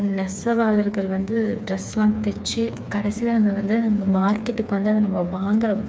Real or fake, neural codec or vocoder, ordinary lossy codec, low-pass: fake; codec, 16 kHz, 4 kbps, FreqCodec, smaller model; none; none